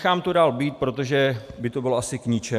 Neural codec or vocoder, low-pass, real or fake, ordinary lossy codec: none; 14.4 kHz; real; AAC, 96 kbps